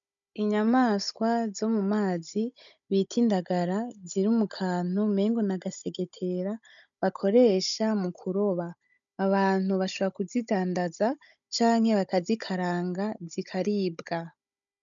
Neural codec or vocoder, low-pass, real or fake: codec, 16 kHz, 16 kbps, FunCodec, trained on Chinese and English, 50 frames a second; 7.2 kHz; fake